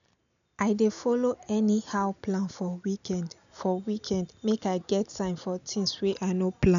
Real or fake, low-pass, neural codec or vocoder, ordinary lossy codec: real; 7.2 kHz; none; none